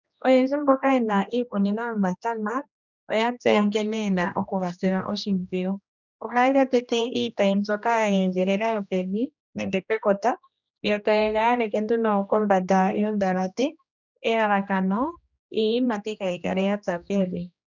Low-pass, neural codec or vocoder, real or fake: 7.2 kHz; codec, 16 kHz, 1 kbps, X-Codec, HuBERT features, trained on general audio; fake